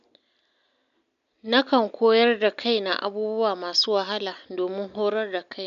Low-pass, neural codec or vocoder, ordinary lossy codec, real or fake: 7.2 kHz; none; none; real